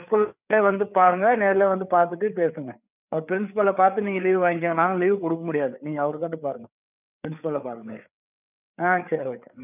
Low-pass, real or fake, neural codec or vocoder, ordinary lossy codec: 3.6 kHz; fake; codec, 16 kHz, 4 kbps, FreqCodec, larger model; none